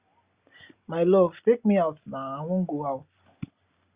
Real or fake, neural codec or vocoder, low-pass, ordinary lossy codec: real; none; 3.6 kHz; Opus, 64 kbps